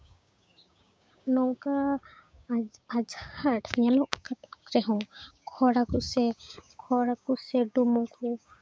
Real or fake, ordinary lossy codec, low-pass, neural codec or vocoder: fake; none; none; codec, 16 kHz, 6 kbps, DAC